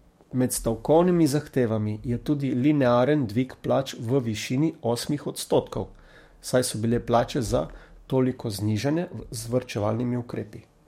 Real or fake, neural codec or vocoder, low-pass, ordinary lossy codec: fake; codec, 44.1 kHz, 7.8 kbps, DAC; 19.8 kHz; MP3, 64 kbps